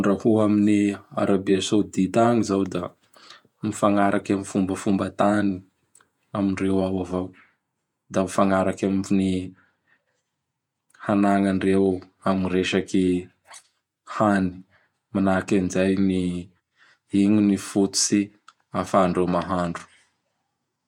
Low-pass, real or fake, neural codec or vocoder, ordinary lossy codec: 19.8 kHz; fake; vocoder, 48 kHz, 128 mel bands, Vocos; MP3, 96 kbps